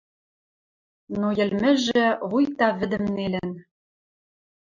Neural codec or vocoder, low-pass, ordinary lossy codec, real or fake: none; 7.2 kHz; MP3, 64 kbps; real